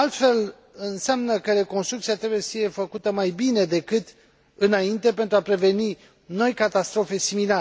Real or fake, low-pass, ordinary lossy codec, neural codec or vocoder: real; none; none; none